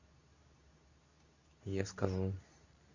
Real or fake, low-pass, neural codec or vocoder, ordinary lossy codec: fake; 7.2 kHz; codec, 24 kHz, 0.9 kbps, WavTokenizer, medium speech release version 2; none